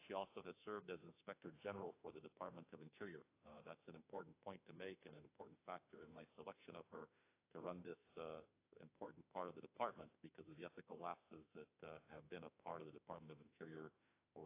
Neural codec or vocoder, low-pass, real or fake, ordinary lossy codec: autoencoder, 48 kHz, 32 numbers a frame, DAC-VAE, trained on Japanese speech; 3.6 kHz; fake; AAC, 24 kbps